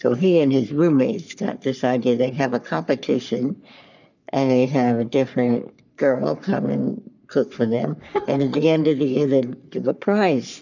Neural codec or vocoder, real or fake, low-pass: codec, 44.1 kHz, 3.4 kbps, Pupu-Codec; fake; 7.2 kHz